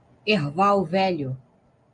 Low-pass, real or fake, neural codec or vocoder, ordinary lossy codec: 9.9 kHz; real; none; MP3, 64 kbps